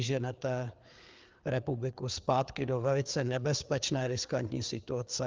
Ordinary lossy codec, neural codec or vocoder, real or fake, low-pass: Opus, 32 kbps; codec, 16 kHz, 4 kbps, FreqCodec, larger model; fake; 7.2 kHz